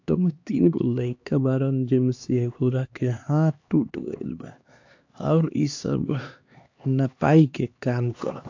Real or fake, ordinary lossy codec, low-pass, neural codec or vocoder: fake; AAC, 48 kbps; 7.2 kHz; codec, 16 kHz, 2 kbps, X-Codec, HuBERT features, trained on LibriSpeech